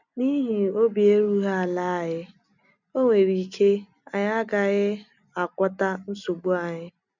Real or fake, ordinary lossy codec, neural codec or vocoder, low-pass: real; none; none; 7.2 kHz